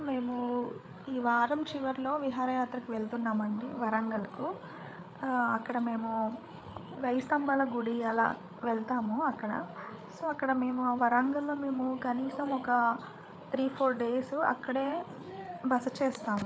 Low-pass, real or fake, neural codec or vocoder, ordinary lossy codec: none; fake; codec, 16 kHz, 8 kbps, FreqCodec, larger model; none